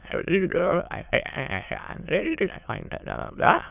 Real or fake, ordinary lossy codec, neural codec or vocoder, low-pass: fake; none; autoencoder, 22.05 kHz, a latent of 192 numbers a frame, VITS, trained on many speakers; 3.6 kHz